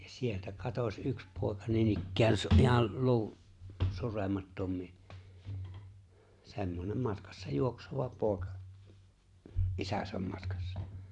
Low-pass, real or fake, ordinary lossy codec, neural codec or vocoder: 10.8 kHz; fake; none; vocoder, 44.1 kHz, 128 mel bands every 512 samples, BigVGAN v2